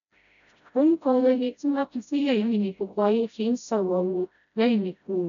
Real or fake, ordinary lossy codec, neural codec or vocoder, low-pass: fake; none; codec, 16 kHz, 0.5 kbps, FreqCodec, smaller model; 7.2 kHz